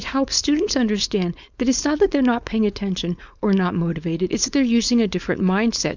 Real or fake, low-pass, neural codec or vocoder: fake; 7.2 kHz; codec, 16 kHz, 4.8 kbps, FACodec